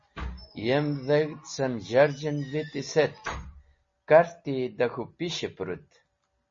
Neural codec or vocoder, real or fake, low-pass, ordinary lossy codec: none; real; 7.2 kHz; MP3, 32 kbps